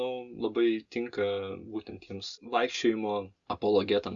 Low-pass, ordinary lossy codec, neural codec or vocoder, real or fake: 7.2 kHz; Opus, 64 kbps; none; real